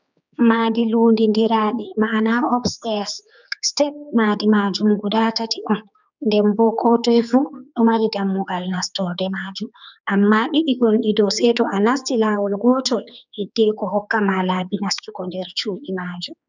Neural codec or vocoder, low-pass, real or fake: codec, 16 kHz, 4 kbps, X-Codec, HuBERT features, trained on general audio; 7.2 kHz; fake